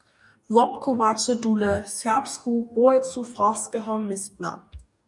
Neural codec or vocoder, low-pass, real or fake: codec, 44.1 kHz, 2.6 kbps, DAC; 10.8 kHz; fake